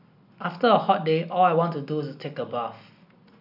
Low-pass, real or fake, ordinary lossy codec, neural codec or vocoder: 5.4 kHz; real; none; none